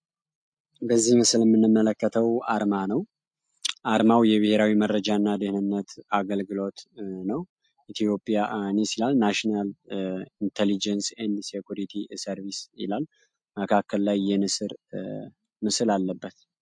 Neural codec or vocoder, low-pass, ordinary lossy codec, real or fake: none; 9.9 kHz; MP3, 48 kbps; real